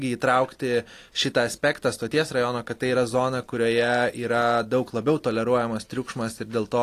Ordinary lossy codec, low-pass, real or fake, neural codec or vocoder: AAC, 48 kbps; 14.4 kHz; real; none